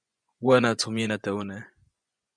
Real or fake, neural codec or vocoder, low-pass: fake; vocoder, 24 kHz, 100 mel bands, Vocos; 9.9 kHz